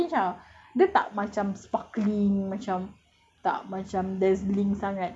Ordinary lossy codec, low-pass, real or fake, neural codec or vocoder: none; none; real; none